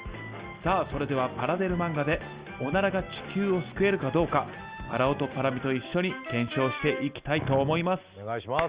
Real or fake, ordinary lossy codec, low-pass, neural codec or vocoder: real; Opus, 32 kbps; 3.6 kHz; none